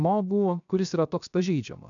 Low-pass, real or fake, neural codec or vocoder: 7.2 kHz; fake; codec, 16 kHz, 0.7 kbps, FocalCodec